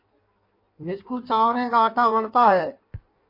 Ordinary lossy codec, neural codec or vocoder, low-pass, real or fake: MP3, 32 kbps; codec, 16 kHz in and 24 kHz out, 1.1 kbps, FireRedTTS-2 codec; 5.4 kHz; fake